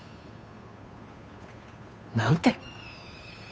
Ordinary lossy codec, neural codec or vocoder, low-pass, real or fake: none; codec, 16 kHz, 2 kbps, FunCodec, trained on Chinese and English, 25 frames a second; none; fake